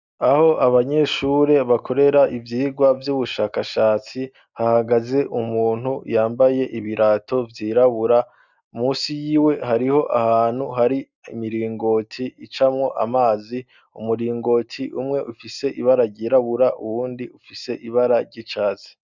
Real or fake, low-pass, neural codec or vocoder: real; 7.2 kHz; none